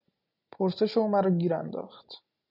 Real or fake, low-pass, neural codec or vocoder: real; 5.4 kHz; none